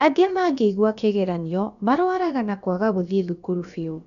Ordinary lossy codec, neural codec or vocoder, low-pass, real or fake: none; codec, 16 kHz, about 1 kbps, DyCAST, with the encoder's durations; 7.2 kHz; fake